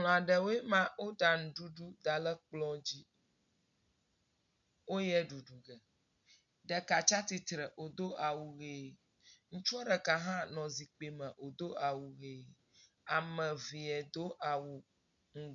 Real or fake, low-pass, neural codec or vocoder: real; 7.2 kHz; none